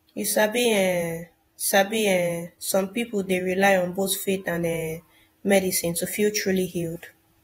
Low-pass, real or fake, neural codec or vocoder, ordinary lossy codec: 19.8 kHz; fake; vocoder, 48 kHz, 128 mel bands, Vocos; AAC, 48 kbps